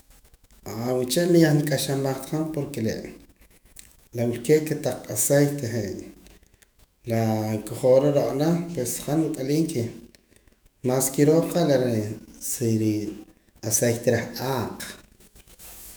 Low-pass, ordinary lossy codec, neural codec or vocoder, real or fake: none; none; autoencoder, 48 kHz, 128 numbers a frame, DAC-VAE, trained on Japanese speech; fake